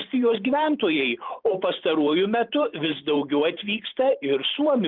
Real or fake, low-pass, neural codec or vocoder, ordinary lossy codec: fake; 5.4 kHz; vocoder, 44.1 kHz, 128 mel bands every 512 samples, BigVGAN v2; Opus, 32 kbps